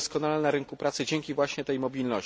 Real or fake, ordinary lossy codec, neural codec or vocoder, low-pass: real; none; none; none